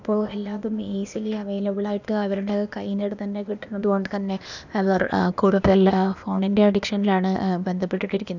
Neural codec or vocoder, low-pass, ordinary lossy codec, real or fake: codec, 16 kHz, 0.8 kbps, ZipCodec; 7.2 kHz; none; fake